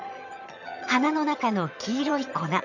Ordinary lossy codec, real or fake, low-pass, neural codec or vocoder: none; fake; 7.2 kHz; vocoder, 22.05 kHz, 80 mel bands, HiFi-GAN